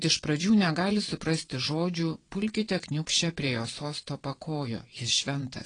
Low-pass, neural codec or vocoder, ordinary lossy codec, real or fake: 9.9 kHz; vocoder, 22.05 kHz, 80 mel bands, WaveNeXt; AAC, 32 kbps; fake